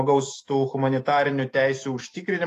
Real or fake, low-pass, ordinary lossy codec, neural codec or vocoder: fake; 14.4 kHz; AAC, 48 kbps; autoencoder, 48 kHz, 128 numbers a frame, DAC-VAE, trained on Japanese speech